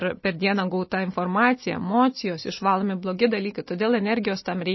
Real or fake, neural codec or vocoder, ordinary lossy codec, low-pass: real; none; MP3, 24 kbps; 7.2 kHz